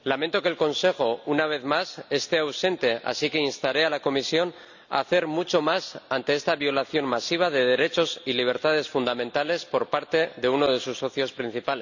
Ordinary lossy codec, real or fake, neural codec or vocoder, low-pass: none; real; none; 7.2 kHz